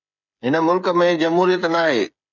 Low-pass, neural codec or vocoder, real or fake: 7.2 kHz; codec, 16 kHz, 8 kbps, FreqCodec, smaller model; fake